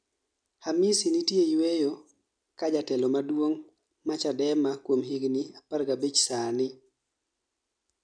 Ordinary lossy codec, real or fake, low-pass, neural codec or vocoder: none; real; 9.9 kHz; none